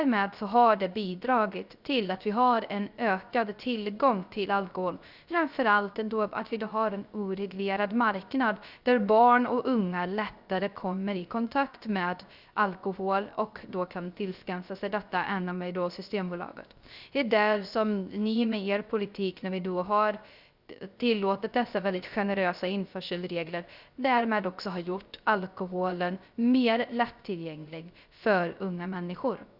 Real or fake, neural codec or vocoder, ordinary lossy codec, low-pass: fake; codec, 16 kHz, 0.3 kbps, FocalCodec; Opus, 64 kbps; 5.4 kHz